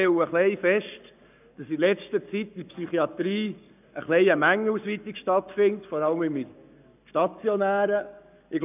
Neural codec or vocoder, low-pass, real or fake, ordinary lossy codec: codec, 44.1 kHz, 7.8 kbps, Pupu-Codec; 3.6 kHz; fake; none